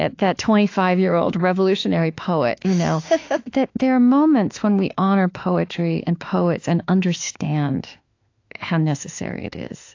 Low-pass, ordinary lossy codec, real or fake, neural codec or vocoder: 7.2 kHz; AAC, 48 kbps; fake; autoencoder, 48 kHz, 32 numbers a frame, DAC-VAE, trained on Japanese speech